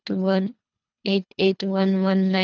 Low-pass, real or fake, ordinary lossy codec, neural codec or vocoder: 7.2 kHz; fake; none; codec, 24 kHz, 3 kbps, HILCodec